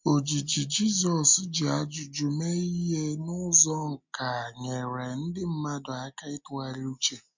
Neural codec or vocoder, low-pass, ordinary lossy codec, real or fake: none; 7.2 kHz; MP3, 64 kbps; real